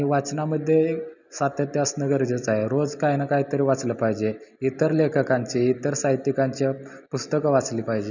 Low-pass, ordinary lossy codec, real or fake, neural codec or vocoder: 7.2 kHz; none; real; none